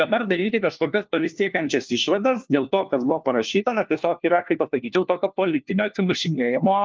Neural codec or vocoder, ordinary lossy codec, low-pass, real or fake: codec, 16 kHz, 1 kbps, FunCodec, trained on LibriTTS, 50 frames a second; Opus, 32 kbps; 7.2 kHz; fake